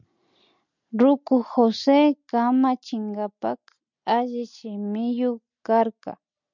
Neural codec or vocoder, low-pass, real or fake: none; 7.2 kHz; real